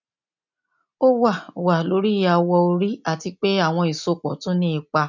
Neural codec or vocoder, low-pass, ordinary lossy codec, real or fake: none; 7.2 kHz; none; real